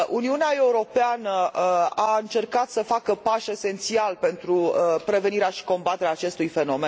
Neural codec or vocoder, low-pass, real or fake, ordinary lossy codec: none; none; real; none